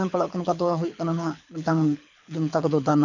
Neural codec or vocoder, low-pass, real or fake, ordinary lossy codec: codec, 24 kHz, 6 kbps, HILCodec; 7.2 kHz; fake; none